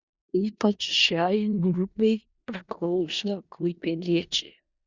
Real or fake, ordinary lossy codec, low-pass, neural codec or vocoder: fake; Opus, 64 kbps; 7.2 kHz; codec, 16 kHz in and 24 kHz out, 0.4 kbps, LongCat-Audio-Codec, four codebook decoder